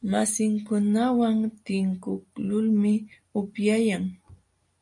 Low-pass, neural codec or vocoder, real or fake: 10.8 kHz; none; real